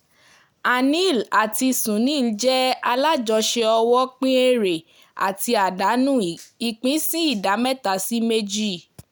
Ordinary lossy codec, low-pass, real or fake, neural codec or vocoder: none; none; real; none